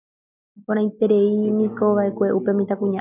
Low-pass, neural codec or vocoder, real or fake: 3.6 kHz; none; real